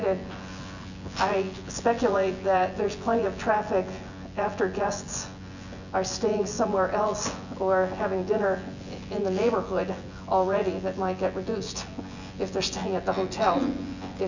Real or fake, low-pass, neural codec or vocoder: fake; 7.2 kHz; vocoder, 24 kHz, 100 mel bands, Vocos